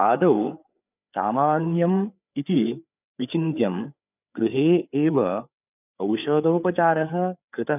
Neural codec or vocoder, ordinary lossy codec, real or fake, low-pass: codec, 16 kHz, 4 kbps, FreqCodec, larger model; none; fake; 3.6 kHz